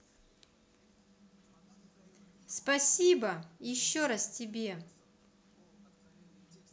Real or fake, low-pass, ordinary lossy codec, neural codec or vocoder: real; none; none; none